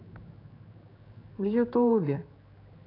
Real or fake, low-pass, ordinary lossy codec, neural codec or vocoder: fake; 5.4 kHz; AAC, 24 kbps; codec, 16 kHz, 4 kbps, X-Codec, HuBERT features, trained on general audio